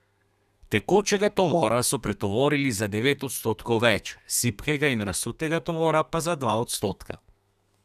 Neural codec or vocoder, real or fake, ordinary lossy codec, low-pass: codec, 32 kHz, 1.9 kbps, SNAC; fake; none; 14.4 kHz